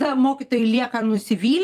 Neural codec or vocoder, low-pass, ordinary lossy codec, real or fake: vocoder, 44.1 kHz, 128 mel bands every 256 samples, BigVGAN v2; 14.4 kHz; Opus, 32 kbps; fake